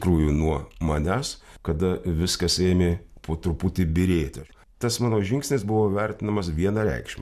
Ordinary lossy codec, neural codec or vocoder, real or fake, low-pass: MP3, 96 kbps; none; real; 14.4 kHz